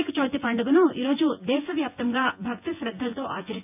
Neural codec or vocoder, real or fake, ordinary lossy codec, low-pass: vocoder, 24 kHz, 100 mel bands, Vocos; fake; AAC, 32 kbps; 3.6 kHz